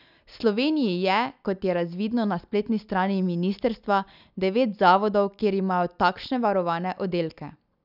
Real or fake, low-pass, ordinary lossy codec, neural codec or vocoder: real; 5.4 kHz; none; none